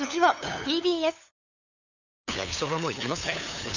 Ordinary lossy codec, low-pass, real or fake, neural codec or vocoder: none; 7.2 kHz; fake; codec, 16 kHz, 8 kbps, FunCodec, trained on LibriTTS, 25 frames a second